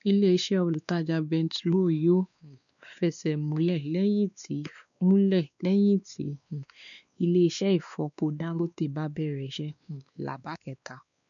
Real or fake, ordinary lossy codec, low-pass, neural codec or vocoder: fake; none; 7.2 kHz; codec, 16 kHz, 2 kbps, X-Codec, WavLM features, trained on Multilingual LibriSpeech